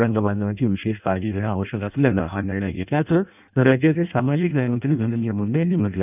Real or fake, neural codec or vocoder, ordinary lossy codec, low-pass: fake; codec, 16 kHz in and 24 kHz out, 0.6 kbps, FireRedTTS-2 codec; none; 3.6 kHz